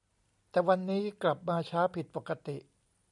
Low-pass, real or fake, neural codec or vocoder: 10.8 kHz; real; none